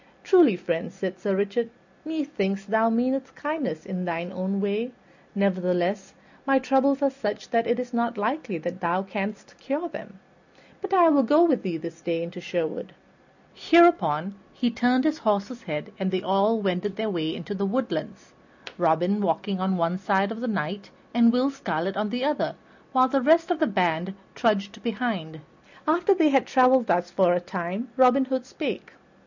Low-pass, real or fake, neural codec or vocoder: 7.2 kHz; real; none